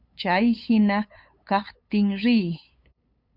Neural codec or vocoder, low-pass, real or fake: codec, 16 kHz, 8 kbps, FunCodec, trained on LibriTTS, 25 frames a second; 5.4 kHz; fake